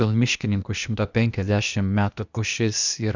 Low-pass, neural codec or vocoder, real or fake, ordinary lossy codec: 7.2 kHz; codec, 16 kHz, 0.8 kbps, ZipCodec; fake; Opus, 64 kbps